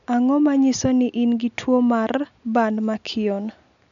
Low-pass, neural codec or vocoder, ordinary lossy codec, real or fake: 7.2 kHz; none; none; real